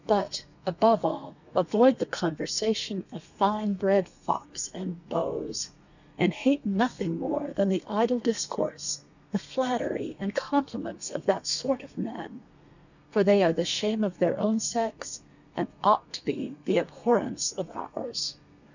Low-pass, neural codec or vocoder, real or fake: 7.2 kHz; codec, 32 kHz, 1.9 kbps, SNAC; fake